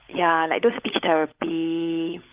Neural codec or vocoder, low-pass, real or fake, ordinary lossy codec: codec, 16 kHz, 16 kbps, FunCodec, trained on LibriTTS, 50 frames a second; 3.6 kHz; fake; Opus, 32 kbps